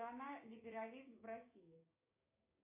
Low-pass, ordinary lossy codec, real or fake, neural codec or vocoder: 3.6 kHz; AAC, 32 kbps; fake; codec, 16 kHz, 6 kbps, DAC